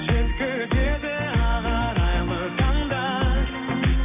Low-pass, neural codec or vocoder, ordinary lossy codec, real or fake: 3.6 kHz; none; AAC, 24 kbps; real